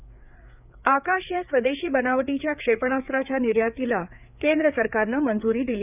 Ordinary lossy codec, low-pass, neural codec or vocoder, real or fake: none; 3.6 kHz; codec, 16 kHz, 4 kbps, FreqCodec, larger model; fake